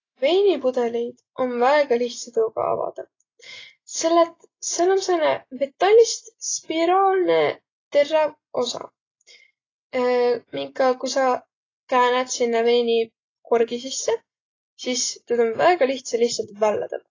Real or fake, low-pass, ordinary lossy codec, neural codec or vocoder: real; 7.2 kHz; AAC, 32 kbps; none